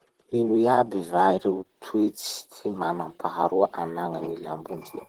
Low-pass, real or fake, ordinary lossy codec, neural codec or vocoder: 19.8 kHz; fake; Opus, 16 kbps; vocoder, 44.1 kHz, 128 mel bands, Pupu-Vocoder